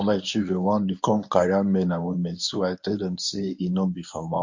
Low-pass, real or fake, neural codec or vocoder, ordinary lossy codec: 7.2 kHz; fake; codec, 24 kHz, 0.9 kbps, WavTokenizer, medium speech release version 1; MP3, 48 kbps